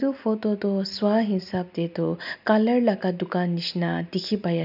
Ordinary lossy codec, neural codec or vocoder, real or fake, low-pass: none; none; real; 5.4 kHz